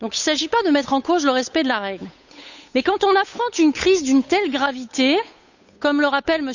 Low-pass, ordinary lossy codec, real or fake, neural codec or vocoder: 7.2 kHz; none; fake; codec, 16 kHz, 8 kbps, FunCodec, trained on Chinese and English, 25 frames a second